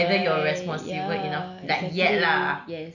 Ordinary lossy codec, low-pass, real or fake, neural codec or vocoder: none; 7.2 kHz; real; none